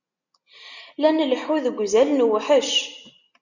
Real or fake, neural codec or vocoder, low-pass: real; none; 7.2 kHz